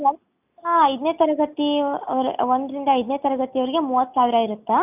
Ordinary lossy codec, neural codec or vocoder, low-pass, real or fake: none; none; 3.6 kHz; real